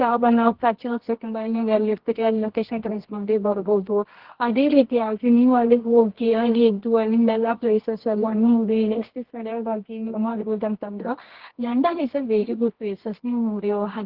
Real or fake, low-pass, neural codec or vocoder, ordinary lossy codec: fake; 5.4 kHz; codec, 24 kHz, 0.9 kbps, WavTokenizer, medium music audio release; Opus, 16 kbps